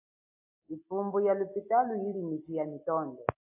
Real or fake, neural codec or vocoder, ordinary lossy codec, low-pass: real; none; AAC, 32 kbps; 3.6 kHz